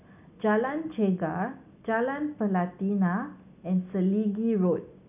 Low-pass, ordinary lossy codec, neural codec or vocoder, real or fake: 3.6 kHz; none; none; real